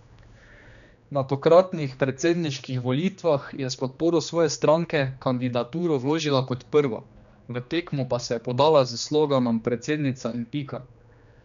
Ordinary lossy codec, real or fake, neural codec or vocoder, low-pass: none; fake; codec, 16 kHz, 2 kbps, X-Codec, HuBERT features, trained on general audio; 7.2 kHz